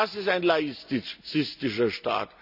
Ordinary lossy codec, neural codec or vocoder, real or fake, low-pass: none; none; real; 5.4 kHz